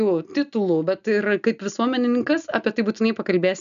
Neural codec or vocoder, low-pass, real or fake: none; 7.2 kHz; real